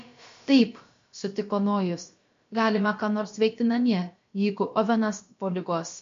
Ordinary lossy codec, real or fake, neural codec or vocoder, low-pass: MP3, 48 kbps; fake; codec, 16 kHz, about 1 kbps, DyCAST, with the encoder's durations; 7.2 kHz